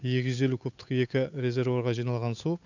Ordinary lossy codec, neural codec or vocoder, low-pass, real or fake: none; codec, 16 kHz, 8 kbps, FunCodec, trained on Chinese and English, 25 frames a second; 7.2 kHz; fake